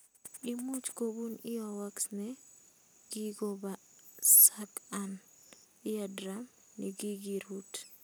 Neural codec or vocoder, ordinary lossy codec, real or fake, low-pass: none; none; real; none